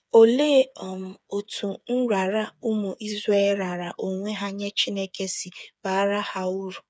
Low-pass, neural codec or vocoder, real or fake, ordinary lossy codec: none; codec, 16 kHz, 8 kbps, FreqCodec, smaller model; fake; none